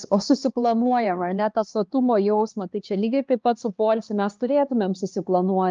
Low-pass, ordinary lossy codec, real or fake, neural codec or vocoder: 7.2 kHz; Opus, 32 kbps; fake; codec, 16 kHz, 2 kbps, X-Codec, HuBERT features, trained on LibriSpeech